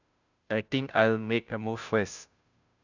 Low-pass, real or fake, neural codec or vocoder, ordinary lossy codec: 7.2 kHz; fake; codec, 16 kHz, 0.5 kbps, FunCodec, trained on Chinese and English, 25 frames a second; none